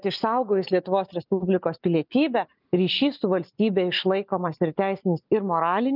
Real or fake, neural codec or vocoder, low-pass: real; none; 5.4 kHz